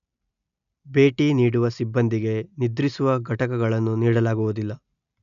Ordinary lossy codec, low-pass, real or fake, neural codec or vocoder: none; 7.2 kHz; real; none